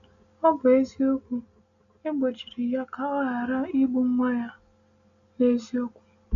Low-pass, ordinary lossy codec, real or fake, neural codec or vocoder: 7.2 kHz; none; real; none